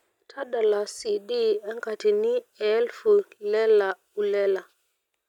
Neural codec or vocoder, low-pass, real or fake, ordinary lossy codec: none; 19.8 kHz; real; none